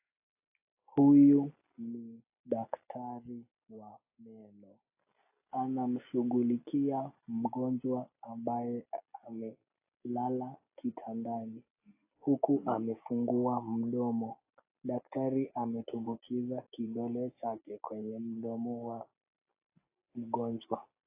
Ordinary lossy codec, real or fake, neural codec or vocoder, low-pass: AAC, 24 kbps; real; none; 3.6 kHz